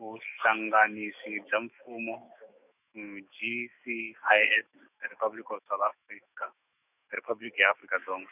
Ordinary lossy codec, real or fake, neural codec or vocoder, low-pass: none; fake; autoencoder, 48 kHz, 128 numbers a frame, DAC-VAE, trained on Japanese speech; 3.6 kHz